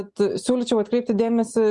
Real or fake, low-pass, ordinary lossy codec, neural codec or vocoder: real; 10.8 kHz; Opus, 64 kbps; none